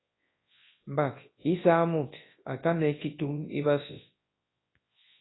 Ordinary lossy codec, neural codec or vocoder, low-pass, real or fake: AAC, 16 kbps; codec, 24 kHz, 0.9 kbps, WavTokenizer, large speech release; 7.2 kHz; fake